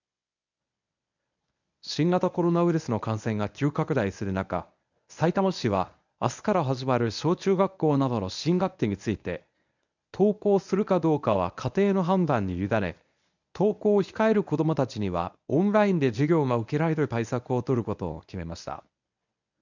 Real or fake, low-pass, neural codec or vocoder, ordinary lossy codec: fake; 7.2 kHz; codec, 24 kHz, 0.9 kbps, WavTokenizer, medium speech release version 1; none